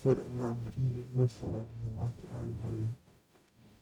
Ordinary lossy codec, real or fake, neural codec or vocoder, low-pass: none; fake; codec, 44.1 kHz, 0.9 kbps, DAC; 19.8 kHz